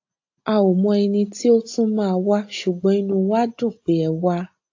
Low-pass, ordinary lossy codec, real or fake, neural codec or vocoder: 7.2 kHz; AAC, 48 kbps; real; none